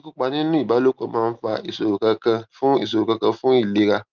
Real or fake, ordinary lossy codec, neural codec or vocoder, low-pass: real; Opus, 24 kbps; none; 7.2 kHz